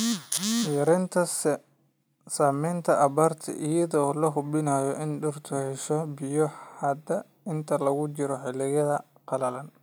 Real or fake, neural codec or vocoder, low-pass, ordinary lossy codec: real; none; none; none